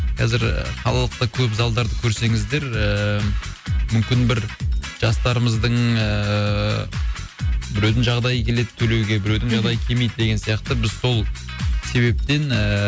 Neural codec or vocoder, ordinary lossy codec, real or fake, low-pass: none; none; real; none